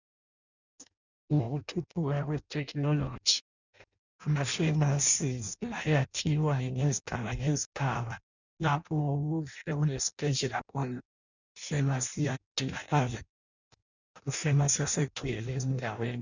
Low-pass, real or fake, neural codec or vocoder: 7.2 kHz; fake; codec, 16 kHz in and 24 kHz out, 0.6 kbps, FireRedTTS-2 codec